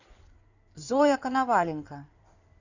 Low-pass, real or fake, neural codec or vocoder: 7.2 kHz; fake; codec, 16 kHz in and 24 kHz out, 2.2 kbps, FireRedTTS-2 codec